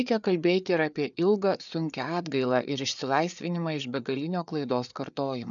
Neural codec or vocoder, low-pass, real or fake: codec, 16 kHz, 4 kbps, FreqCodec, larger model; 7.2 kHz; fake